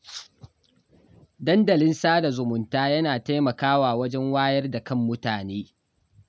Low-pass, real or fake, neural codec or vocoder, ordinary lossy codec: none; real; none; none